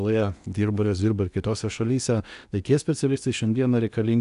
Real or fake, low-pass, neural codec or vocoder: fake; 10.8 kHz; codec, 16 kHz in and 24 kHz out, 0.8 kbps, FocalCodec, streaming, 65536 codes